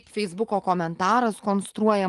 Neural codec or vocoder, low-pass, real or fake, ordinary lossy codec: none; 10.8 kHz; real; Opus, 24 kbps